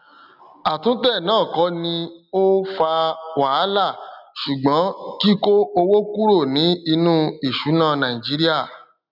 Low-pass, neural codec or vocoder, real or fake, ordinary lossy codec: 5.4 kHz; none; real; none